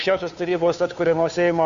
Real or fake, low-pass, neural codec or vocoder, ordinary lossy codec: fake; 7.2 kHz; codec, 16 kHz, 2 kbps, FunCodec, trained on Chinese and English, 25 frames a second; AAC, 64 kbps